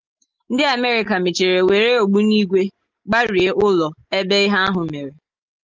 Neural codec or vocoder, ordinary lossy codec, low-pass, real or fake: none; Opus, 32 kbps; 7.2 kHz; real